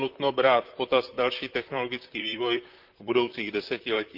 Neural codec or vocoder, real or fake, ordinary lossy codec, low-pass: vocoder, 44.1 kHz, 128 mel bands, Pupu-Vocoder; fake; Opus, 32 kbps; 5.4 kHz